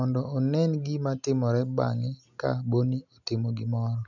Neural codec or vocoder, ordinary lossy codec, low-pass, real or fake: none; none; 7.2 kHz; real